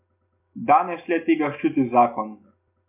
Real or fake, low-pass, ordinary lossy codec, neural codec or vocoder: real; 3.6 kHz; AAC, 32 kbps; none